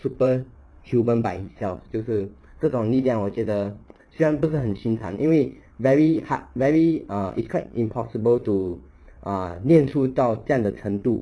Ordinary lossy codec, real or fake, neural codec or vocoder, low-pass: none; fake; vocoder, 22.05 kHz, 80 mel bands, WaveNeXt; none